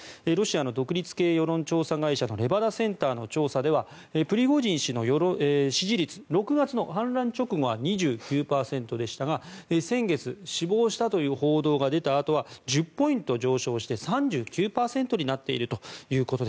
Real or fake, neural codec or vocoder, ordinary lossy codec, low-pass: real; none; none; none